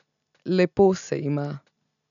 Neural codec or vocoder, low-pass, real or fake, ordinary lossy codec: none; 7.2 kHz; real; none